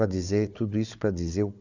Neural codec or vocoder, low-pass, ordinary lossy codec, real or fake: codec, 16 kHz, 4 kbps, FunCodec, trained on Chinese and English, 50 frames a second; 7.2 kHz; none; fake